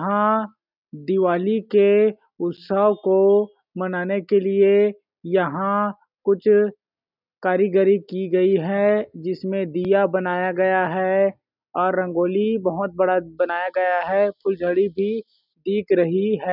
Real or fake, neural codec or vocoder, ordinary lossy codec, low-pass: real; none; none; 5.4 kHz